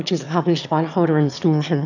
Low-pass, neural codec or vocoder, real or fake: 7.2 kHz; autoencoder, 22.05 kHz, a latent of 192 numbers a frame, VITS, trained on one speaker; fake